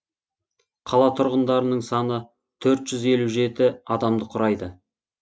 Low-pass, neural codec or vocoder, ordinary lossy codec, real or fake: none; none; none; real